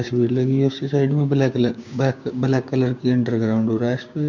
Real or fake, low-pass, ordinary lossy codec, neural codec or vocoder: fake; 7.2 kHz; none; codec, 16 kHz, 6 kbps, DAC